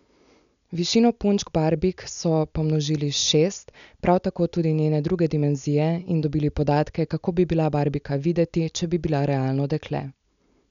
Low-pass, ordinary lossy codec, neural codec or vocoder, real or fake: 7.2 kHz; none; none; real